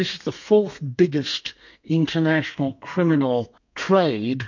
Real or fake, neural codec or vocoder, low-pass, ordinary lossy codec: fake; codec, 32 kHz, 1.9 kbps, SNAC; 7.2 kHz; MP3, 48 kbps